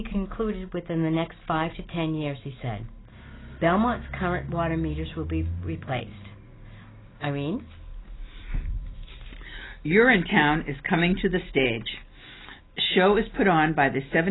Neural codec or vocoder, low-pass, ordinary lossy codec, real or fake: none; 7.2 kHz; AAC, 16 kbps; real